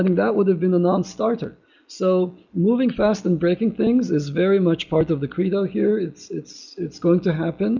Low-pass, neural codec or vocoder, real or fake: 7.2 kHz; none; real